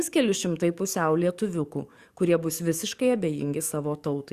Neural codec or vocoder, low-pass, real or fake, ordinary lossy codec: codec, 44.1 kHz, 7.8 kbps, DAC; 14.4 kHz; fake; Opus, 64 kbps